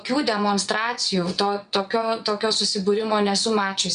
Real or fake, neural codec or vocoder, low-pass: fake; vocoder, 22.05 kHz, 80 mel bands, WaveNeXt; 9.9 kHz